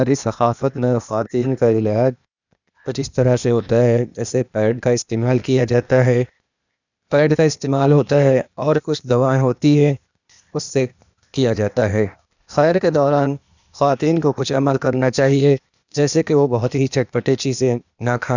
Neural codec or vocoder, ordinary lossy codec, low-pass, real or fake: codec, 16 kHz, 0.8 kbps, ZipCodec; none; 7.2 kHz; fake